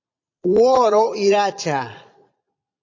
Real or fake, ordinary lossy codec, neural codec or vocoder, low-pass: fake; AAC, 48 kbps; vocoder, 22.05 kHz, 80 mel bands, Vocos; 7.2 kHz